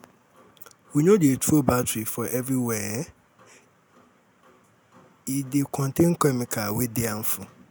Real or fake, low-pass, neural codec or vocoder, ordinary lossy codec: real; none; none; none